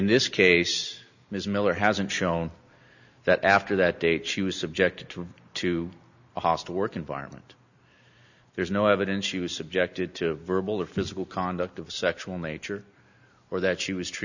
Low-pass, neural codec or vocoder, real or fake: 7.2 kHz; none; real